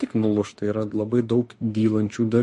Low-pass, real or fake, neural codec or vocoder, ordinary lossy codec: 14.4 kHz; fake; autoencoder, 48 kHz, 32 numbers a frame, DAC-VAE, trained on Japanese speech; MP3, 48 kbps